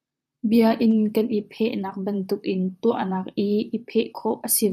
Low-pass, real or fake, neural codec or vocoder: 14.4 kHz; fake; vocoder, 44.1 kHz, 128 mel bands, Pupu-Vocoder